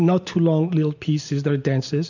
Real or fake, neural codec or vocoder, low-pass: real; none; 7.2 kHz